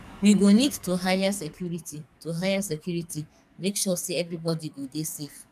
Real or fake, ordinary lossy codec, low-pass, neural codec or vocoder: fake; none; 14.4 kHz; codec, 44.1 kHz, 2.6 kbps, SNAC